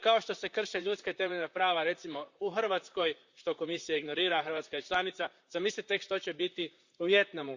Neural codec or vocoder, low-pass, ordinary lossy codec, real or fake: vocoder, 44.1 kHz, 128 mel bands, Pupu-Vocoder; 7.2 kHz; Opus, 64 kbps; fake